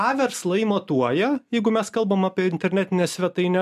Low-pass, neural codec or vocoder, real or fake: 14.4 kHz; none; real